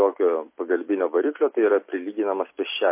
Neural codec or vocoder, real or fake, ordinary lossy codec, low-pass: none; real; MP3, 24 kbps; 3.6 kHz